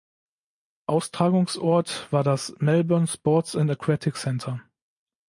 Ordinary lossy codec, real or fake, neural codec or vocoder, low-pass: MP3, 48 kbps; real; none; 10.8 kHz